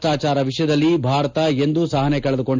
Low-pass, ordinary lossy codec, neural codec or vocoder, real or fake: 7.2 kHz; MP3, 64 kbps; none; real